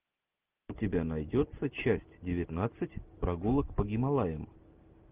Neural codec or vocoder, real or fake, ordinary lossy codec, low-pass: none; real; Opus, 32 kbps; 3.6 kHz